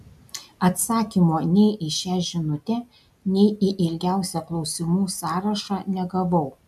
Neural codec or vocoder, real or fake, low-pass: none; real; 14.4 kHz